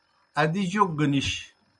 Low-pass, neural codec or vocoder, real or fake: 10.8 kHz; none; real